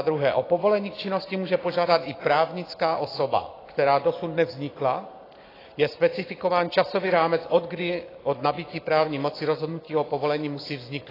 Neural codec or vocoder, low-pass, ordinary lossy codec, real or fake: none; 5.4 kHz; AAC, 24 kbps; real